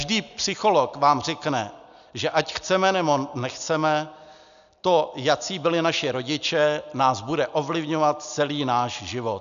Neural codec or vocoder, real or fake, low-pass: none; real; 7.2 kHz